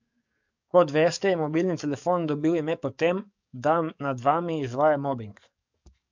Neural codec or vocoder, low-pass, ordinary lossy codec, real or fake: codec, 44.1 kHz, 7.8 kbps, DAC; 7.2 kHz; MP3, 64 kbps; fake